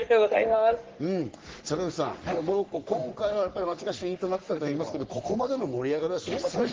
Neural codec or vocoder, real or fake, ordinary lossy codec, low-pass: codec, 44.1 kHz, 3.4 kbps, Pupu-Codec; fake; Opus, 16 kbps; 7.2 kHz